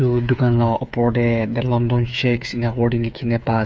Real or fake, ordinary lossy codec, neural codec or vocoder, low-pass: fake; none; codec, 16 kHz, 8 kbps, FreqCodec, smaller model; none